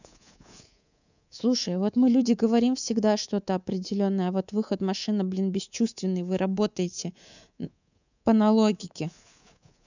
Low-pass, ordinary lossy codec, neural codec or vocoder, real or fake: 7.2 kHz; none; codec, 24 kHz, 3.1 kbps, DualCodec; fake